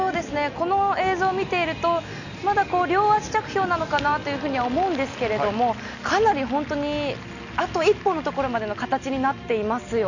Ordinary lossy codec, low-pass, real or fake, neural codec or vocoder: none; 7.2 kHz; real; none